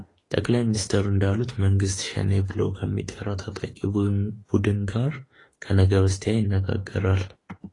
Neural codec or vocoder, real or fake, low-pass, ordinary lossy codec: autoencoder, 48 kHz, 32 numbers a frame, DAC-VAE, trained on Japanese speech; fake; 10.8 kHz; AAC, 32 kbps